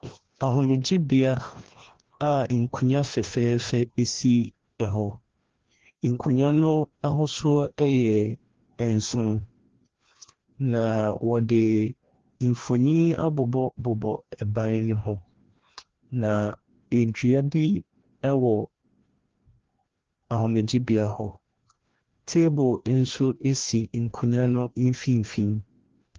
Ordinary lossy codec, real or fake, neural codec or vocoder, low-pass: Opus, 16 kbps; fake; codec, 16 kHz, 1 kbps, FreqCodec, larger model; 7.2 kHz